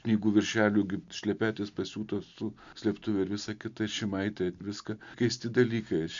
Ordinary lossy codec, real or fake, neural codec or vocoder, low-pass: MP3, 64 kbps; real; none; 7.2 kHz